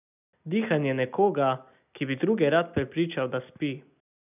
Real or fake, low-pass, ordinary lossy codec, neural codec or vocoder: real; 3.6 kHz; none; none